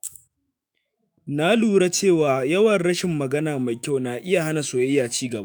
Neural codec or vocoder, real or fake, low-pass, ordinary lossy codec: autoencoder, 48 kHz, 128 numbers a frame, DAC-VAE, trained on Japanese speech; fake; none; none